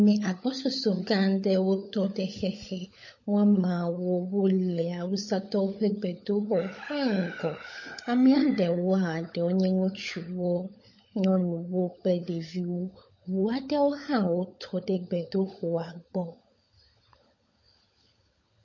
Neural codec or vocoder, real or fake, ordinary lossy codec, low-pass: codec, 16 kHz, 16 kbps, FunCodec, trained on LibriTTS, 50 frames a second; fake; MP3, 32 kbps; 7.2 kHz